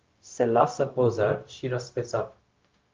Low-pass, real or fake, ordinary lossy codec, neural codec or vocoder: 7.2 kHz; fake; Opus, 24 kbps; codec, 16 kHz, 0.4 kbps, LongCat-Audio-Codec